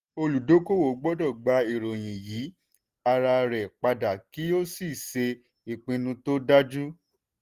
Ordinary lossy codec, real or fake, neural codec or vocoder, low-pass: Opus, 24 kbps; real; none; 14.4 kHz